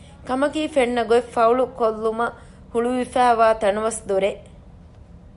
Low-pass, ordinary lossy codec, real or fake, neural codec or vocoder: 10.8 kHz; MP3, 96 kbps; real; none